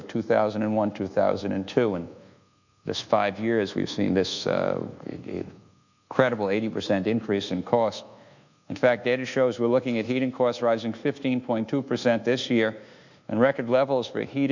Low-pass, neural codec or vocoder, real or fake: 7.2 kHz; codec, 24 kHz, 1.2 kbps, DualCodec; fake